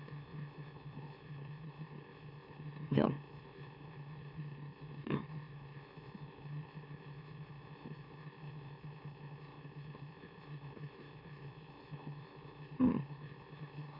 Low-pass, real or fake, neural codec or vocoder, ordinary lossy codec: 5.4 kHz; fake; autoencoder, 44.1 kHz, a latent of 192 numbers a frame, MeloTTS; none